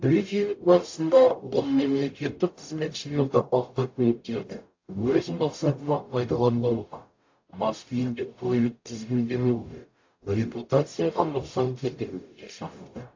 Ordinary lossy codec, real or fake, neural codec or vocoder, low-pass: AAC, 48 kbps; fake; codec, 44.1 kHz, 0.9 kbps, DAC; 7.2 kHz